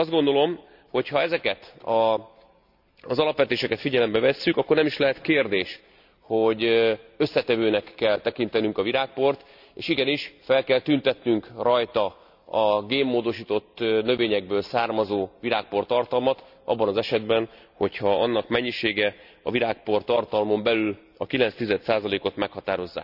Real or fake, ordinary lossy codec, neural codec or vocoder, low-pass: real; none; none; 5.4 kHz